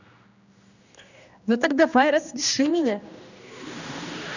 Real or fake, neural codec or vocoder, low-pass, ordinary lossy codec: fake; codec, 16 kHz, 1 kbps, X-Codec, HuBERT features, trained on general audio; 7.2 kHz; none